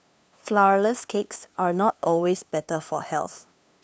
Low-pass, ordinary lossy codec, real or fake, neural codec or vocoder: none; none; fake; codec, 16 kHz, 2 kbps, FunCodec, trained on LibriTTS, 25 frames a second